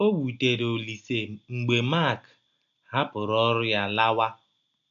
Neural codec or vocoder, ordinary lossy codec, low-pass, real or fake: none; MP3, 96 kbps; 7.2 kHz; real